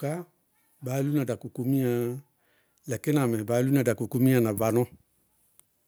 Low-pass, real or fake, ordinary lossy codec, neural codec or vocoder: none; real; none; none